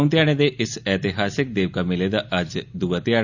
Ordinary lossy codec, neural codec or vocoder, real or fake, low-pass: none; none; real; 7.2 kHz